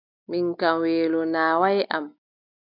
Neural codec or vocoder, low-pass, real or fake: none; 5.4 kHz; real